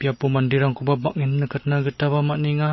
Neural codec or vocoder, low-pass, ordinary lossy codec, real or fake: none; 7.2 kHz; MP3, 24 kbps; real